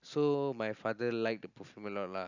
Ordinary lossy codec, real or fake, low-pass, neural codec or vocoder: none; real; 7.2 kHz; none